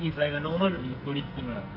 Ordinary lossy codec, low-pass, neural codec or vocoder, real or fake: none; 5.4 kHz; codec, 44.1 kHz, 2.6 kbps, SNAC; fake